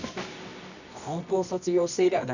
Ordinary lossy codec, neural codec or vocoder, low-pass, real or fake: none; codec, 24 kHz, 0.9 kbps, WavTokenizer, medium music audio release; 7.2 kHz; fake